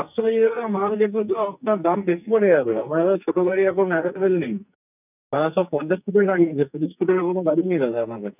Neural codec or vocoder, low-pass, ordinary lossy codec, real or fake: codec, 44.1 kHz, 2.6 kbps, SNAC; 3.6 kHz; none; fake